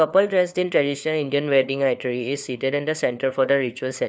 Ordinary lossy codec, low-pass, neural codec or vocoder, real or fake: none; none; codec, 16 kHz, 4 kbps, FunCodec, trained on LibriTTS, 50 frames a second; fake